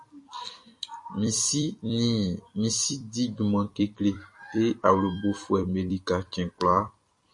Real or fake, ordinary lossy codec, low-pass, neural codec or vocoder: fake; MP3, 48 kbps; 10.8 kHz; vocoder, 44.1 kHz, 128 mel bands every 256 samples, BigVGAN v2